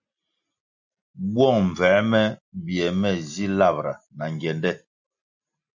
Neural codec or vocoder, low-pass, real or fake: none; 7.2 kHz; real